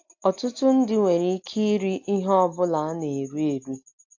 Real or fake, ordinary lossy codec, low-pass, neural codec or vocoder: real; AAC, 48 kbps; 7.2 kHz; none